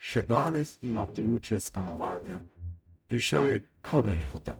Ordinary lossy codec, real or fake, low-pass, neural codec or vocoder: none; fake; none; codec, 44.1 kHz, 0.9 kbps, DAC